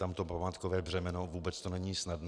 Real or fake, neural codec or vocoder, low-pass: real; none; 9.9 kHz